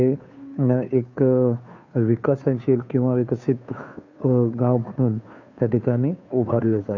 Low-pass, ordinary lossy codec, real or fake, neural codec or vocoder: 7.2 kHz; none; fake; codec, 16 kHz, 2 kbps, FunCodec, trained on Chinese and English, 25 frames a second